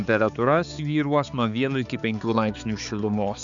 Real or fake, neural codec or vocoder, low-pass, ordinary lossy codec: fake; codec, 16 kHz, 4 kbps, X-Codec, HuBERT features, trained on balanced general audio; 7.2 kHz; Opus, 64 kbps